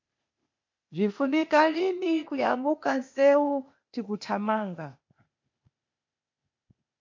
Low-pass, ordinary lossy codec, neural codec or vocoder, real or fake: 7.2 kHz; MP3, 48 kbps; codec, 16 kHz, 0.8 kbps, ZipCodec; fake